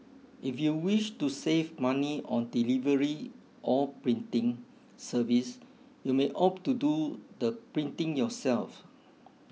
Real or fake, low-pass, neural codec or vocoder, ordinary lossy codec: real; none; none; none